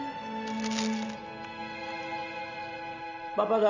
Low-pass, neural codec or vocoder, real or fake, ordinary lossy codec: 7.2 kHz; none; real; none